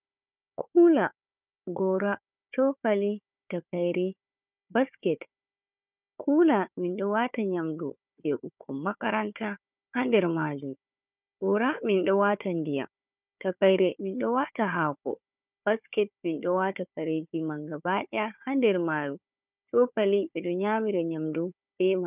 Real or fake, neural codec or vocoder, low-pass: fake; codec, 16 kHz, 4 kbps, FunCodec, trained on Chinese and English, 50 frames a second; 3.6 kHz